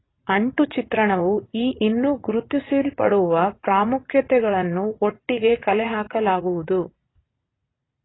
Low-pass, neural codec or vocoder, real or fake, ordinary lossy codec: 7.2 kHz; vocoder, 44.1 kHz, 80 mel bands, Vocos; fake; AAC, 16 kbps